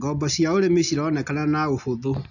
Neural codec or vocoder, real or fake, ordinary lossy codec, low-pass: none; real; none; 7.2 kHz